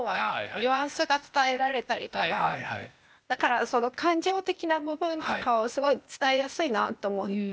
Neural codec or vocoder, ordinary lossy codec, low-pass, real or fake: codec, 16 kHz, 0.8 kbps, ZipCodec; none; none; fake